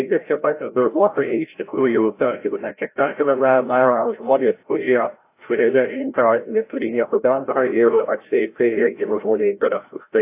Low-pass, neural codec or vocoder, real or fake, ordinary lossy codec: 3.6 kHz; codec, 16 kHz, 0.5 kbps, FreqCodec, larger model; fake; AAC, 24 kbps